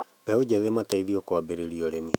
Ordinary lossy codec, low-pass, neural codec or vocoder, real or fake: none; 19.8 kHz; autoencoder, 48 kHz, 128 numbers a frame, DAC-VAE, trained on Japanese speech; fake